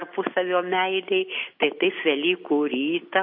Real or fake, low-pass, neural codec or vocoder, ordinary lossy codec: real; 5.4 kHz; none; MP3, 32 kbps